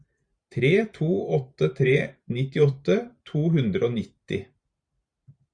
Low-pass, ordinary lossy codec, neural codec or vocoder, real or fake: 9.9 kHz; Opus, 64 kbps; vocoder, 44.1 kHz, 128 mel bands every 512 samples, BigVGAN v2; fake